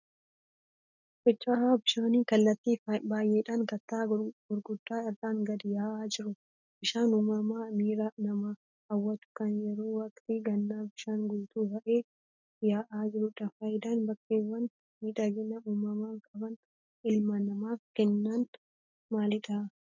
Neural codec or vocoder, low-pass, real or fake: none; 7.2 kHz; real